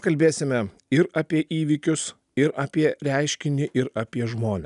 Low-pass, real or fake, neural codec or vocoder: 10.8 kHz; real; none